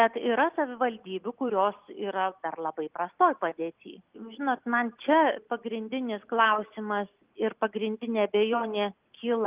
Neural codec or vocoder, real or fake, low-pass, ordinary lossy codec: none; real; 3.6 kHz; Opus, 32 kbps